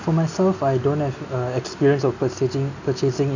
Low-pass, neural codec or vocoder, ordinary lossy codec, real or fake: 7.2 kHz; none; none; real